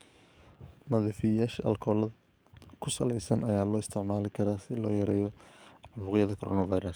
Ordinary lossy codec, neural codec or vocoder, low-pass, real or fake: none; codec, 44.1 kHz, 7.8 kbps, DAC; none; fake